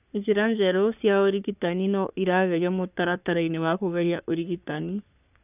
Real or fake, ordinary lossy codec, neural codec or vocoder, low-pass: fake; none; codec, 44.1 kHz, 3.4 kbps, Pupu-Codec; 3.6 kHz